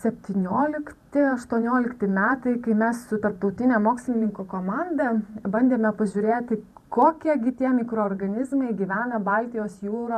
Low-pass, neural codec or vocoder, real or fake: 14.4 kHz; vocoder, 44.1 kHz, 128 mel bands every 512 samples, BigVGAN v2; fake